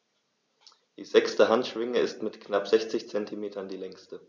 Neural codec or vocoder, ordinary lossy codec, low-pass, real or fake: none; none; none; real